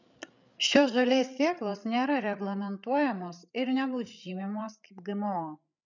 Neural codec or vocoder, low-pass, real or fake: codec, 16 kHz, 8 kbps, FreqCodec, larger model; 7.2 kHz; fake